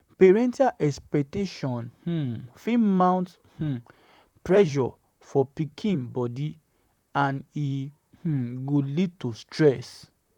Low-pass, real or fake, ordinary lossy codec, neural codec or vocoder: 19.8 kHz; fake; none; vocoder, 44.1 kHz, 128 mel bands, Pupu-Vocoder